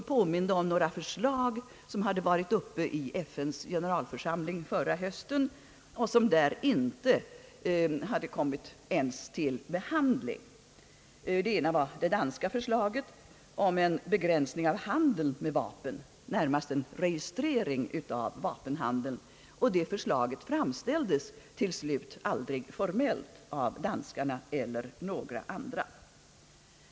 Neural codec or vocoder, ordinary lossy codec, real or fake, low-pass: none; none; real; none